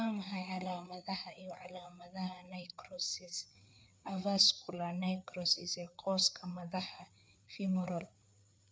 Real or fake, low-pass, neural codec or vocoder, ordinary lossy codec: fake; none; codec, 16 kHz, 4 kbps, FreqCodec, larger model; none